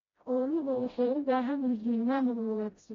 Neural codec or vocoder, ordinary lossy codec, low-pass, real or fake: codec, 16 kHz, 0.5 kbps, FreqCodec, smaller model; AAC, 32 kbps; 7.2 kHz; fake